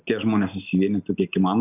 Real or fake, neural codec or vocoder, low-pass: real; none; 3.6 kHz